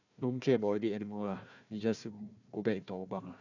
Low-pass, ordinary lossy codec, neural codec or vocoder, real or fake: 7.2 kHz; none; codec, 16 kHz, 1 kbps, FunCodec, trained on Chinese and English, 50 frames a second; fake